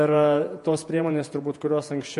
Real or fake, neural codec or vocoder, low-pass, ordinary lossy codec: fake; vocoder, 48 kHz, 128 mel bands, Vocos; 14.4 kHz; MP3, 48 kbps